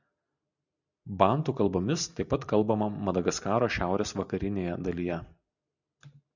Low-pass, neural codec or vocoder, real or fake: 7.2 kHz; none; real